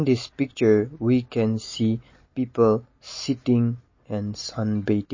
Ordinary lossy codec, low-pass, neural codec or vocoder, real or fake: MP3, 32 kbps; 7.2 kHz; none; real